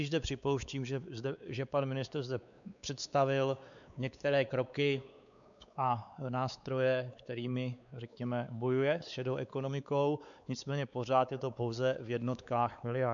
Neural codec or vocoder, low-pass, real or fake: codec, 16 kHz, 4 kbps, X-Codec, WavLM features, trained on Multilingual LibriSpeech; 7.2 kHz; fake